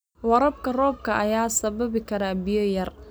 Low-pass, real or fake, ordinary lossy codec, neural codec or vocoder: none; real; none; none